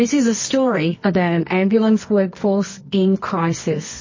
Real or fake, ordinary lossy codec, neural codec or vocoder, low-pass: fake; MP3, 32 kbps; codec, 24 kHz, 0.9 kbps, WavTokenizer, medium music audio release; 7.2 kHz